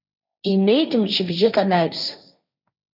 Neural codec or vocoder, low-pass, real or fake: codec, 16 kHz, 1.1 kbps, Voila-Tokenizer; 5.4 kHz; fake